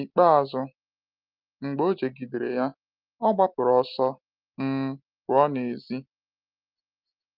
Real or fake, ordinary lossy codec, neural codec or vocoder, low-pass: real; Opus, 32 kbps; none; 5.4 kHz